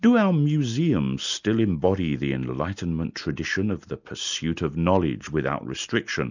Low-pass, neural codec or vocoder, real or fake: 7.2 kHz; none; real